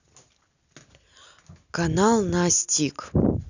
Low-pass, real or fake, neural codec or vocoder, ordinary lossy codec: 7.2 kHz; real; none; none